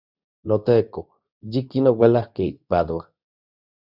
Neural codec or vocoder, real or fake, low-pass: codec, 24 kHz, 0.9 kbps, WavTokenizer, medium speech release version 2; fake; 5.4 kHz